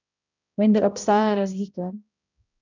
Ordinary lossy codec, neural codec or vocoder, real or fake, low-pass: none; codec, 16 kHz, 0.5 kbps, X-Codec, HuBERT features, trained on balanced general audio; fake; 7.2 kHz